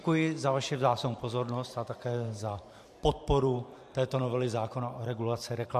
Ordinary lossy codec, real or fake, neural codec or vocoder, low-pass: MP3, 64 kbps; fake; vocoder, 44.1 kHz, 128 mel bands every 512 samples, BigVGAN v2; 14.4 kHz